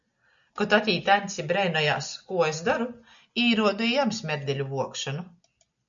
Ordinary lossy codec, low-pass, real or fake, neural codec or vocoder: MP3, 96 kbps; 7.2 kHz; real; none